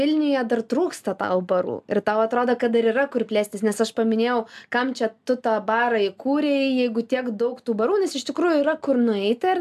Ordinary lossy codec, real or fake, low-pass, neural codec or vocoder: AAC, 96 kbps; real; 14.4 kHz; none